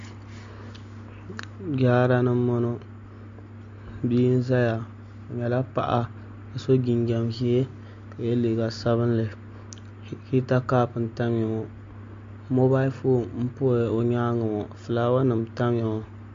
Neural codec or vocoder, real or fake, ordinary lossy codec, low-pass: none; real; MP3, 48 kbps; 7.2 kHz